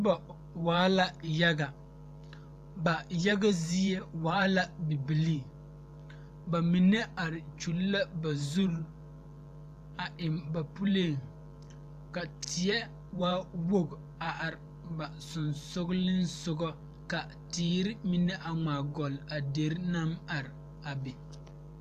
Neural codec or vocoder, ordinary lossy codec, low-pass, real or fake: vocoder, 44.1 kHz, 128 mel bands every 512 samples, BigVGAN v2; AAC, 96 kbps; 14.4 kHz; fake